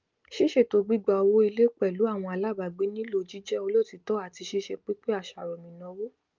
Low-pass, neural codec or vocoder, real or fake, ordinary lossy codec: 7.2 kHz; none; real; Opus, 24 kbps